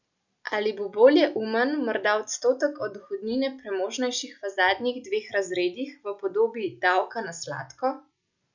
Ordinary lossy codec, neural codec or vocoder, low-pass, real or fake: none; none; 7.2 kHz; real